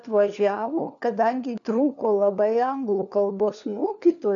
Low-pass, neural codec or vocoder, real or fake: 7.2 kHz; codec, 16 kHz, 4 kbps, FunCodec, trained on LibriTTS, 50 frames a second; fake